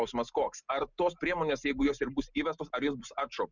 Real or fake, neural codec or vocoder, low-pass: real; none; 7.2 kHz